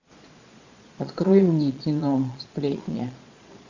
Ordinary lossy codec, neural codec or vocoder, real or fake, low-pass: AAC, 48 kbps; vocoder, 22.05 kHz, 80 mel bands, Vocos; fake; 7.2 kHz